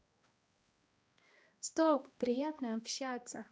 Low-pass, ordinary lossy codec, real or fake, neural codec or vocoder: none; none; fake; codec, 16 kHz, 1 kbps, X-Codec, HuBERT features, trained on balanced general audio